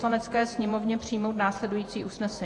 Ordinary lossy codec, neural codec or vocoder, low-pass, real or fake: AAC, 48 kbps; vocoder, 48 kHz, 128 mel bands, Vocos; 10.8 kHz; fake